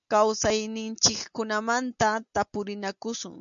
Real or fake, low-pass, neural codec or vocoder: real; 7.2 kHz; none